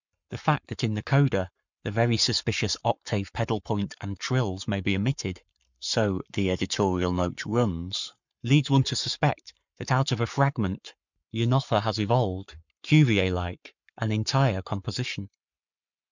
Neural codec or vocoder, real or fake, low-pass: codec, 44.1 kHz, 7.8 kbps, Pupu-Codec; fake; 7.2 kHz